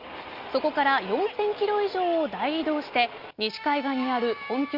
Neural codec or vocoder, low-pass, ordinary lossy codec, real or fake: none; 5.4 kHz; Opus, 32 kbps; real